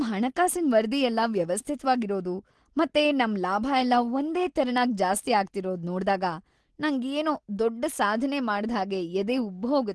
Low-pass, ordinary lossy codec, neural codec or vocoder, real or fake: 10.8 kHz; Opus, 16 kbps; none; real